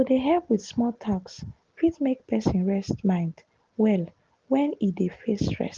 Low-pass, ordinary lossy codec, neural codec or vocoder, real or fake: 7.2 kHz; Opus, 16 kbps; none; real